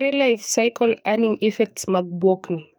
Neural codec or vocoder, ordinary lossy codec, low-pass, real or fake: codec, 44.1 kHz, 2.6 kbps, SNAC; none; none; fake